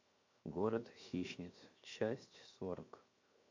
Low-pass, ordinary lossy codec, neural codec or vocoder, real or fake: 7.2 kHz; MP3, 48 kbps; codec, 16 kHz, 0.7 kbps, FocalCodec; fake